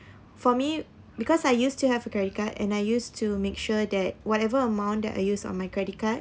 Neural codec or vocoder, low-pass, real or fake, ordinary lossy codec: none; none; real; none